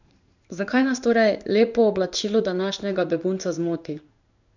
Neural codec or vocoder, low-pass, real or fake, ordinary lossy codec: codec, 16 kHz in and 24 kHz out, 1 kbps, XY-Tokenizer; 7.2 kHz; fake; none